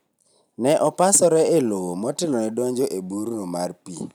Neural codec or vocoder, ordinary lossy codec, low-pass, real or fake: none; none; none; real